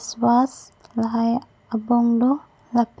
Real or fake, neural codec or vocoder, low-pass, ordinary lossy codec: real; none; none; none